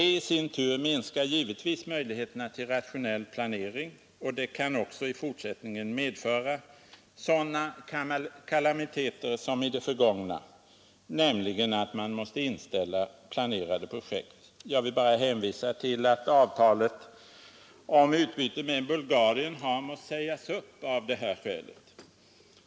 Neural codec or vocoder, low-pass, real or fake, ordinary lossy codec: none; none; real; none